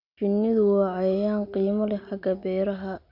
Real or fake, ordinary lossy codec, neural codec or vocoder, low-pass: real; none; none; 5.4 kHz